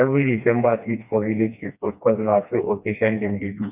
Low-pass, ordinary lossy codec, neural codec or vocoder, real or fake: 3.6 kHz; none; codec, 16 kHz, 2 kbps, FreqCodec, smaller model; fake